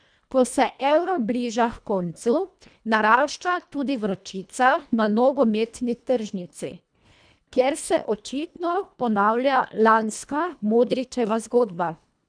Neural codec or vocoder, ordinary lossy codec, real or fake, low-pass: codec, 24 kHz, 1.5 kbps, HILCodec; none; fake; 9.9 kHz